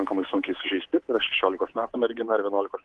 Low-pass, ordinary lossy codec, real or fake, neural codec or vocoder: 10.8 kHz; Opus, 16 kbps; fake; codec, 24 kHz, 3.1 kbps, DualCodec